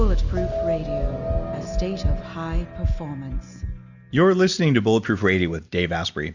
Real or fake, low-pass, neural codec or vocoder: real; 7.2 kHz; none